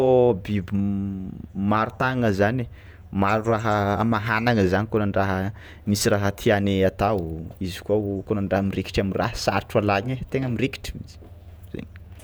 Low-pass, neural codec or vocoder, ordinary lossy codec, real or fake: none; vocoder, 48 kHz, 128 mel bands, Vocos; none; fake